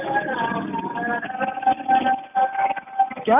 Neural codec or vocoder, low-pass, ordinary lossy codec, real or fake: none; 3.6 kHz; none; real